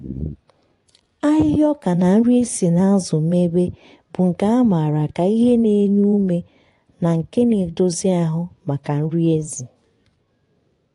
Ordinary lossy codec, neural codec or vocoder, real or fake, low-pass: AAC, 48 kbps; vocoder, 24 kHz, 100 mel bands, Vocos; fake; 10.8 kHz